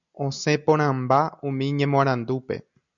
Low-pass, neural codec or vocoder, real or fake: 7.2 kHz; none; real